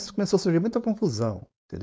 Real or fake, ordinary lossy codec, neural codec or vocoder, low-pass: fake; none; codec, 16 kHz, 4.8 kbps, FACodec; none